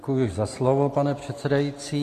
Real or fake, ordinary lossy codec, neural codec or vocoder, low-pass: real; AAC, 48 kbps; none; 14.4 kHz